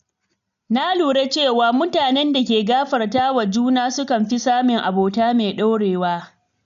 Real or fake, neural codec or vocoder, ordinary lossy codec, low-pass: real; none; none; 7.2 kHz